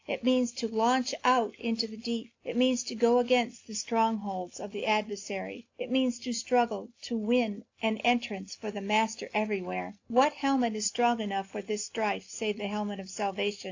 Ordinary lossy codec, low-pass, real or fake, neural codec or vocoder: AAC, 48 kbps; 7.2 kHz; real; none